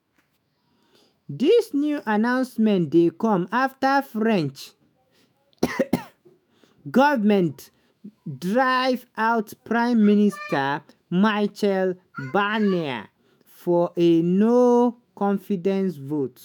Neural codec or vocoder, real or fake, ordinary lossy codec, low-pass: autoencoder, 48 kHz, 128 numbers a frame, DAC-VAE, trained on Japanese speech; fake; none; none